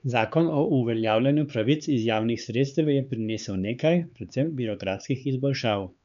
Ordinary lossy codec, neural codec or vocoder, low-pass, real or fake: none; codec, 16 kHz, 4 kbps, X-Codec, HuBERT features, trained on LibriSpeech; 7.2 kHz; fake